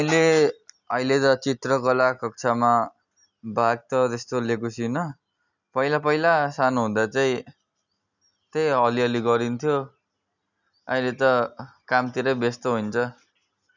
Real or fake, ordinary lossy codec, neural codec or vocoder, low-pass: real; none; none; 7.2 kHz